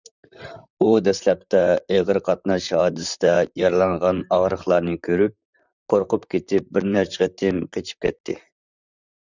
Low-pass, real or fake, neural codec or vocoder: 7.2 kHz; fake; vocoder, 44.1 kHz, 128 mel bands, Pupu-Vocoder